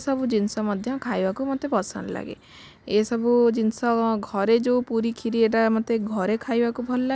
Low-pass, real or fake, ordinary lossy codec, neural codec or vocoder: none; real; none; none